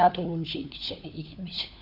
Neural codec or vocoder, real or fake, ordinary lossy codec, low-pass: codec, 16 kHz, 4 kbps, FunCodec, trained on LibriTTS, 50 frames a second; fake; none; 5.4 kHz